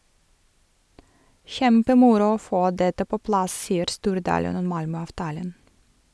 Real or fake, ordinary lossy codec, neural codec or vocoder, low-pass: real; none; none; none